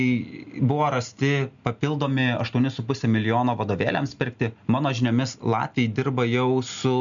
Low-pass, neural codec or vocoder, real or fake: 7.2 kHz; none; real